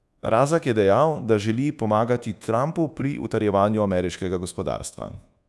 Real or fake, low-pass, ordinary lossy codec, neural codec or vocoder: fake; none; none; codec, 24 kHz, 1.2 kbps, DualCodec